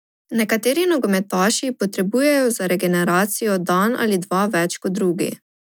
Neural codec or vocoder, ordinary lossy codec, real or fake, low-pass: none; none; real; none